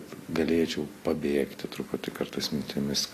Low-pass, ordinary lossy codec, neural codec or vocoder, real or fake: 14.4 kHz; AAC, 48 kbps; none; real